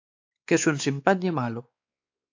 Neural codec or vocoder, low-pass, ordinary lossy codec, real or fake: codec, 16 kHz, 4 kbps, X-Codec, WavLM features, trained on Multilingual LibriSpeech; 7.2 kHz; AAC, 48 kbps; fake